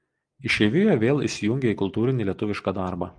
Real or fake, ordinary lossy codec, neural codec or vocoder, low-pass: real; Opus, 32 kbps; none; 9.9 kHz